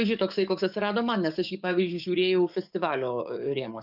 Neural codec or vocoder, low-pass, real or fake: codec, 44.1 kHz, 7.8 kbps, DAC; 5.4 kHz; fake